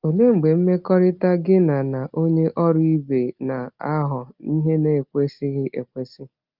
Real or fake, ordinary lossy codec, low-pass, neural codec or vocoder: real; Opus, 24 kbps; 5.4 kHz; none